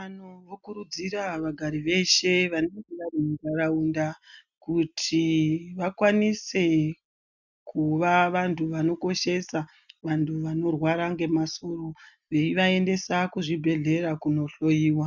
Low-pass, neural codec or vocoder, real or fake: 7.2 kHz; none; real